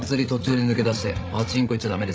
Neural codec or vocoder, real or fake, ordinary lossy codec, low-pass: codec, 16 kHz, 16 kbps, FreqCodec, larger model; fake; none; none